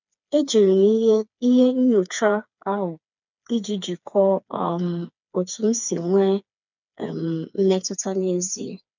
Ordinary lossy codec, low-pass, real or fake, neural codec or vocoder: none; 7.2 kHz; fake; codec, 16 kHz, 4 kbps, FreqCodec, smaller model